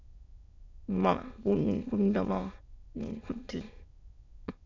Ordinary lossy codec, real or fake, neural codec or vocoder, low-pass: MP3, 64 kbps; fake; autoencoder, 22.05 kHz, a latent of 192 numbers a frame, VITS, trained on many speakers; 7.2 kHz